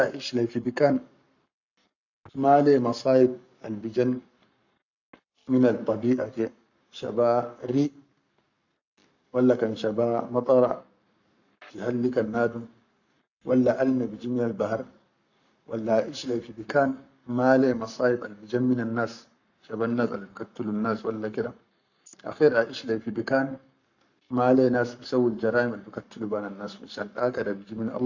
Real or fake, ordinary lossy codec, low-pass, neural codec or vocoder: fake; none; 7.2 kHz; codec, 44.1 kHz, 7.8 kbps, Pupu-Codec